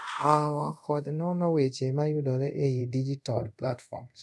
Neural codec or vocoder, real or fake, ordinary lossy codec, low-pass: codec, 24 kHz, 0.5 kbps, DualCodec; fake; none; none